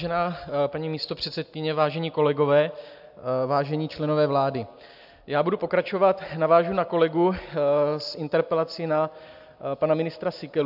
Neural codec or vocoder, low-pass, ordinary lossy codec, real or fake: none; 5.4 kHz; AAC, 48 kbps; real